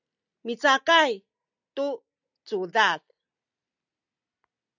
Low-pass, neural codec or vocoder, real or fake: 7.2 kHz; none; real